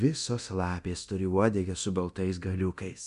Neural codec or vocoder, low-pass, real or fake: codec, 24 kHz, 0.9 kbps, DualCodec; 10.8 kHz; fake